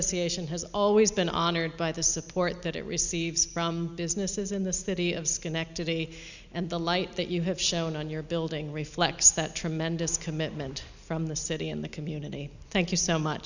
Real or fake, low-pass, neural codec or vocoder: real; 7.2 kHz; none